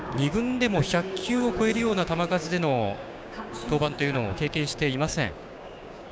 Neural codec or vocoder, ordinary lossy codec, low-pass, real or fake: codec, 16 kHz, 6 kbps, DAC; none; none; fake